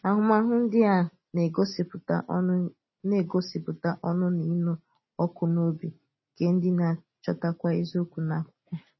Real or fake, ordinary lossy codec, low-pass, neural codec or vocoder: real; MP3, 24 kbps; 7.2 kHz; none